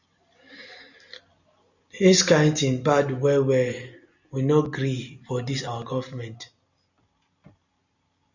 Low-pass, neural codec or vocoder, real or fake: 7.2 kHz; none; real